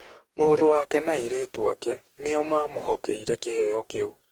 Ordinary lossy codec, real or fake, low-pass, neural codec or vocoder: Opus, 24 kbps; fake; 19.8 kHz; codec, 44.1 kHz, 2.6 kbps, DAC